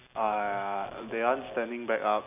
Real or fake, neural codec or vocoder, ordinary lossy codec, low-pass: real; none; none; 3.6 kHz